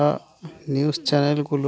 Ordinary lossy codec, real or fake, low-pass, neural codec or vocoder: none; real; none; none